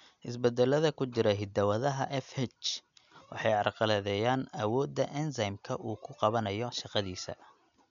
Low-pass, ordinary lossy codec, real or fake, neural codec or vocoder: 7.2 kHz; none; real; none